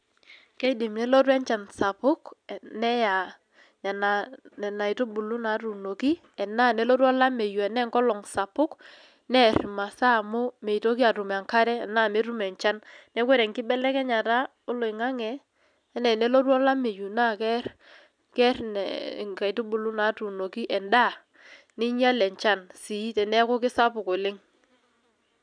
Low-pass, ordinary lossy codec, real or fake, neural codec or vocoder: 9.9 kHz; none; real; none